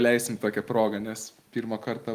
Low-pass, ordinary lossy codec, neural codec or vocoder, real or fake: 14.4 kHz; Opus, 32 kbps; none; real